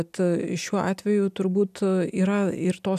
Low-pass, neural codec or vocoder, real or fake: 14.4 kHz; none; real